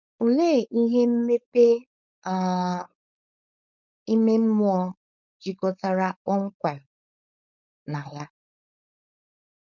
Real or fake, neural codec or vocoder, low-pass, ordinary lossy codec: fake; codec, 16 kHz, 4.8 kbps, FACodec; 7.2 kHz; none